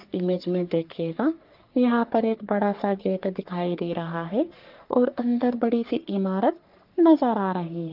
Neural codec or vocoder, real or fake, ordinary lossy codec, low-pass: codec, 44.1 kHz, 3.4 kbps, Pupu-Codec; fake; Opus, 32 kbps; 5.4 kHz